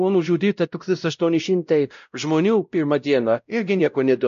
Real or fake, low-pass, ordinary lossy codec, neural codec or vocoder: fake; 7.2 kHz; MP3, 64 kbps; codec, 16 kHz, 0.5 kbps, X-Codec, WavLM features, trained on Multilingual LibriSpeech